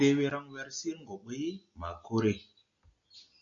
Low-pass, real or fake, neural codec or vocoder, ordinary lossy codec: 7.2 kHz; real; none; MP3, 48 kbps